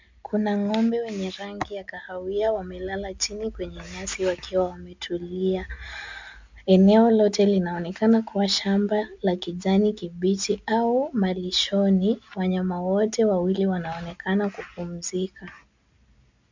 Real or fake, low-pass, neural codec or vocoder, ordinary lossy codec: real; 7.2 kHz; none; MP3, 64 kbps